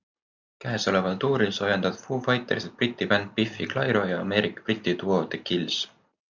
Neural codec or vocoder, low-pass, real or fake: none; 7.2 kHz; real